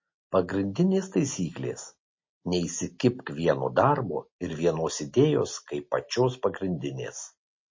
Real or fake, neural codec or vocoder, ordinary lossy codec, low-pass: real; none; MP3, 32 kbps; 7.2 kHz